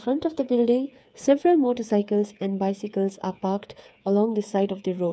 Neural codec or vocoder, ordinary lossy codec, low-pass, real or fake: codec, 16 kHz, 8 kbps, FreqCodec, smaller model; none; none; fake